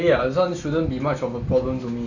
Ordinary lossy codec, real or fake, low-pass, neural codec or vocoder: none; real; 7.2 kHz; none